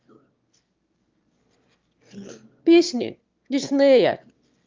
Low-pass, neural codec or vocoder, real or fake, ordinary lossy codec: 7.2 kHz; autoencoder, 22.05 kHz, a latent of 192 numbers a frame, VITS, trained on one speaker; fake; Opus, 32 kbps